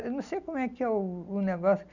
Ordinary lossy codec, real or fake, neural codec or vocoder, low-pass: none; real; none; 7.2 kHz